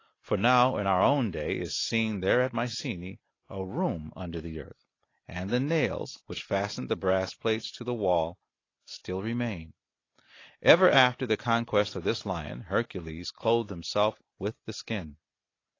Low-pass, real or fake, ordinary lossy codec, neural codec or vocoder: 7.2 kHz; real; AAC, 32 kbps; none